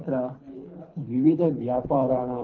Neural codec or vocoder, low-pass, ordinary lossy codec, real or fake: codec, 24 kHz, 3 kbps, HILCodec; 7.2 kHz; Opus, 24 kbps; fake